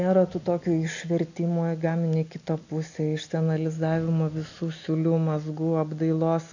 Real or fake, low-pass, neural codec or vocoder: real; 7.2 kHz; none